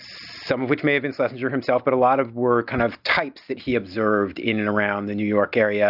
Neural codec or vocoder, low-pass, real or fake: none; 5.4 kHz; real